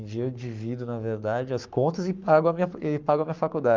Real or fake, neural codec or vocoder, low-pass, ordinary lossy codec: fake; codec, 44.1 kHz, 7.8 kbps, Pupu-Codec; 7.2 kHz; Opus, 24 kbps